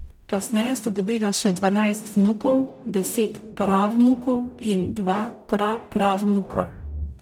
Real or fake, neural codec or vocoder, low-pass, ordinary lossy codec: fake; codec, 44.1 kHz, 0.9 kbps, DAC; 19.8 kHz; none